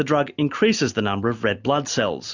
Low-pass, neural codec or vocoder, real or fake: 7.2 kHz; none; real